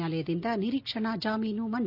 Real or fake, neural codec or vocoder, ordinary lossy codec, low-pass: real; none; none; 5.4 kHz